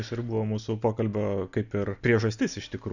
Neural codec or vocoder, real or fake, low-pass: none; real; 7.2 kHz